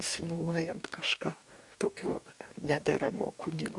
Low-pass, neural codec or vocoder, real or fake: 10.8 kHz; codec, 44.1 kHz, 2.6 kbps, DAC; fake